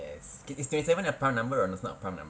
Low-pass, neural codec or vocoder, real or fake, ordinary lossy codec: none; none; real; none